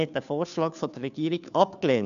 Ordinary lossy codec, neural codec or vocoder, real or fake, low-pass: none; codec, 16 kHz, 2 kbps, FunCodec, trained on Chinese and English, 25 frames a second; fake; 7.2 kHz